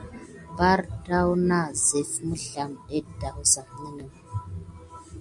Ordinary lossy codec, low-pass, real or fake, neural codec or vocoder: MP3, 96 kbps; 10.8 kHz; real; none